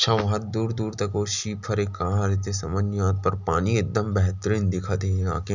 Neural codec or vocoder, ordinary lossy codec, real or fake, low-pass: none; none; real; 7.2 kHz